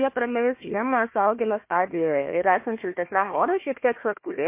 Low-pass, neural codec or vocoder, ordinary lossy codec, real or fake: 3.6 kHz; codec, 16 kHz, 1 kbps, FunCodec, trained on Chinese and English, 50 frames a second; MP3, 24 kbps; fake